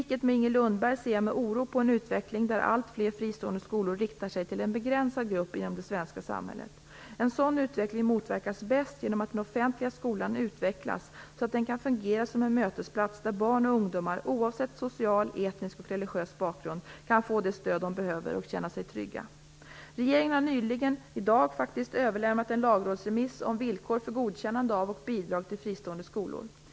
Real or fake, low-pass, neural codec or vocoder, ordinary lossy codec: real; none; none; none